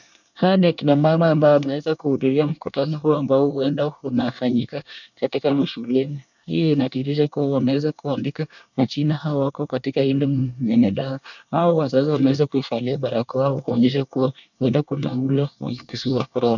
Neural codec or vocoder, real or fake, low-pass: codec, 24 kHz, 1 kbps, SNAC; fake; 7.2 kHz